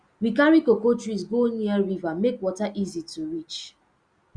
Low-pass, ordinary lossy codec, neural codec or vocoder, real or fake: 9.9 kHz; Opus, 64 kbps; none; real